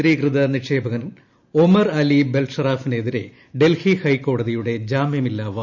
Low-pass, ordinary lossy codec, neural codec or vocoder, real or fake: 7.2 kHz; none; none; real